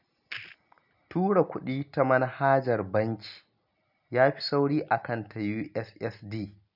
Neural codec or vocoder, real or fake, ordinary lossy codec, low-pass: none; real; none; 5.4 kHz